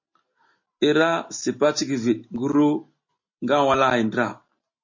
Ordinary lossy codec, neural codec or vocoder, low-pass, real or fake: MP3, 32 kbps; none; 7.2 kHz; real